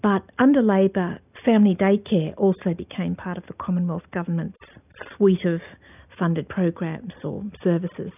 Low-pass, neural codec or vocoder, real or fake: 3.6 kHz; none; real